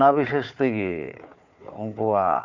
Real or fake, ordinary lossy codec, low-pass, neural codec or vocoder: fake; none; 7.2 kHz; codec, 16 kHz, 4 kbps, FunCodec, trained on Chinese and English, 50 frames a second